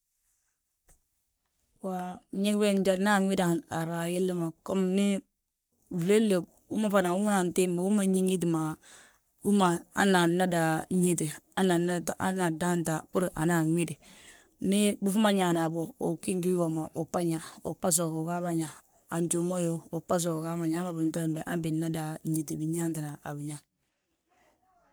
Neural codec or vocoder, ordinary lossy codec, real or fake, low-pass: codec, 44.1 kHz, 3.4 kbps, Pupu-Codec; none; fake; none